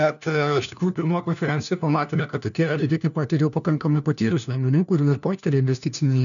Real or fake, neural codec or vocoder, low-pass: fake; codec, 16 kHz, 1 kbps, FunCodec, trained on LibriTTS, 50 frames a second; 7.2 kHz